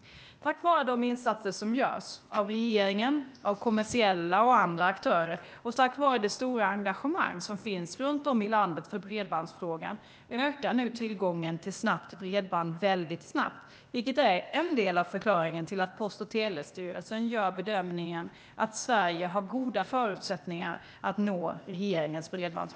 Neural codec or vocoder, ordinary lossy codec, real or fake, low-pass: codec, 16 kHz, 0.8 kbps, ZipCodec; none; fake; none